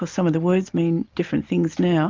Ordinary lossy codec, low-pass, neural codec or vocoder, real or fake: Opus, 24 kbps; 7.2 kHz; none; real